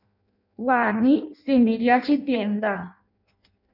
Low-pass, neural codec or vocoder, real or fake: 5.4 kHz; codec, 16 kHz in and 24 kHz out, 0.6 kbps, FireRedTTS-2 codec; fake